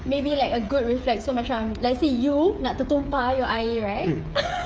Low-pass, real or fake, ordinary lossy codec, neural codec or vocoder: none; fake; none; codec, 16 kHz, 8 kbps, FreqCodec, smaller model